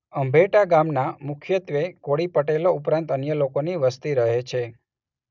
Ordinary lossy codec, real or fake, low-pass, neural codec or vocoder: none; real; 7.2 kHz; none